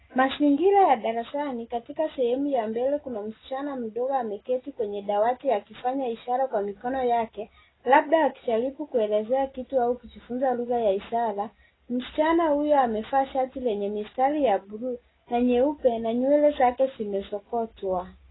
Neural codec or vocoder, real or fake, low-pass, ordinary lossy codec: none; real; 7.2 kHz; AAC, 16 kbps